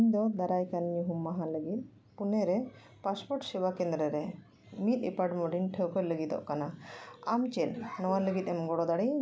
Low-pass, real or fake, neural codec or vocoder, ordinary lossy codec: none; real; none; none